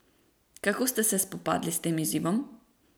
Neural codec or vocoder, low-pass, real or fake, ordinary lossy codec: none; none; real; none